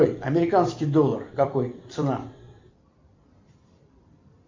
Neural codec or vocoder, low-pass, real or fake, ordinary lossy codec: vocoder, 44.1 kHz, 128 mel bands every 256 samples, BigVGAN v2; 7.2 kHz; fake; MP3, 48 kbps